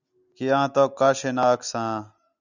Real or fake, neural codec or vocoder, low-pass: real; none; 7.2 kHz